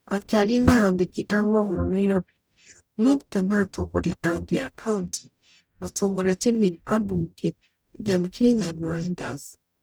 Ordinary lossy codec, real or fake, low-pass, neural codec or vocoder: none; fake; none; codec, 44.1 kHz, 0.9 kbps, DAC